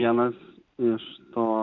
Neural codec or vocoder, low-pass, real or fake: none; 7.2 kHz; real